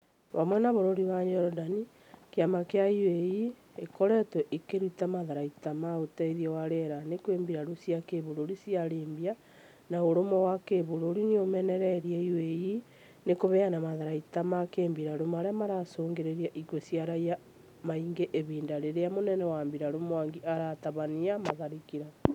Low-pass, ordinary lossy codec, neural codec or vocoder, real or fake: 19.8 kHz; none; none; real